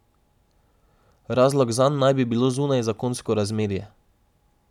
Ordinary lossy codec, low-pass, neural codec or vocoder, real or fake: none; 19.8 kHz; none; real